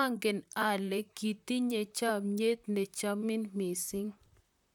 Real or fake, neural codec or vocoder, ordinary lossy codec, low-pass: fake; vocoder, 44.1 kHz, 128 mel bands, Pupu-Vocoder; none; 19.8 kHz